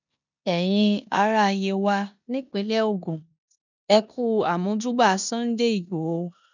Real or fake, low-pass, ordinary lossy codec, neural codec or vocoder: fake; 7.2 kHz; none; codec, 16 kHz in and 24 kHz out, 0.9 kbps, LongCat-Audio-Codec, four codebook decoder